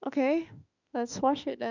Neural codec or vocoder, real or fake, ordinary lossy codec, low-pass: autoencoder, 48 kHz, 32 numbers a frame, DAC-VAE, trained on Japanese speech; fake; none; 7.2 kHz